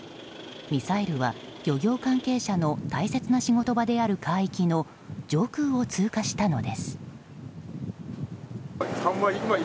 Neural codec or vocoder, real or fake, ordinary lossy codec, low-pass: none; real; none; none